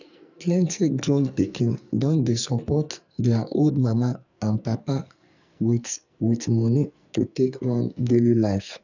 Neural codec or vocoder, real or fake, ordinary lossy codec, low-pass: codec, 44.1 kHz, 2.6 kbps, SNAC; fake; none; 7.2 kHz